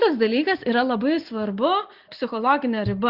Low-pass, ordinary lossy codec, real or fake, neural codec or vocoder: 5.4 kHz; Opus, 64 kbps; real; none